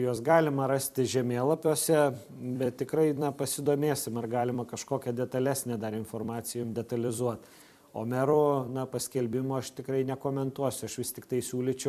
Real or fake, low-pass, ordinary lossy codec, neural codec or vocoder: fake; 14.4 kHz; MP3, 96 kbps; vocoder, 44.1 kHz, 128 mel bands every 256 samples, BigVGAN v2